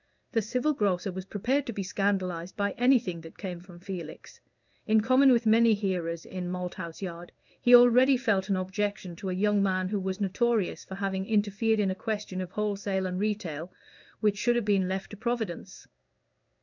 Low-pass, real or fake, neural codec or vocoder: 7.2 kHz; fake; codec, 16 kHz in and 24 kHz out, 1 kbps, XY-Tokenizer